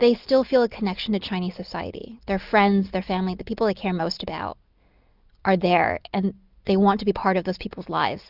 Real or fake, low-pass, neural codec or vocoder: real; 5.4 kHz; none